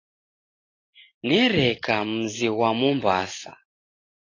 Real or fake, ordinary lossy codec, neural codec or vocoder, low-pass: real; AAC, 32 kbps; none; 7.2 kHz